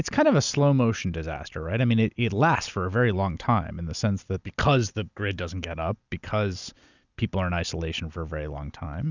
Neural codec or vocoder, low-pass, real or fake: none; 7.2 kHz; real